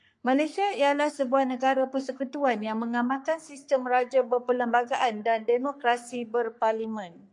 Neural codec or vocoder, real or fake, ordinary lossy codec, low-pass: codec, 44.1 kHz, 3.4 kbps, Pupu-Codec; fake; MP3, 64 kbps; 10.8 kHz